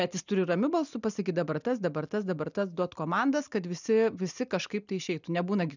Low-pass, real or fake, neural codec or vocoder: 7.2 kHz; real; none